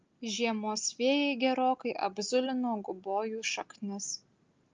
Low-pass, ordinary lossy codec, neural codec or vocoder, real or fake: 7.2 kHz; Opus, 24 kbps; none; real